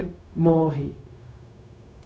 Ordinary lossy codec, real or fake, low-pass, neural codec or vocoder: none; fake; none; codec, 16 kHz, 0.4 kbps, LongCat-Audio-Codec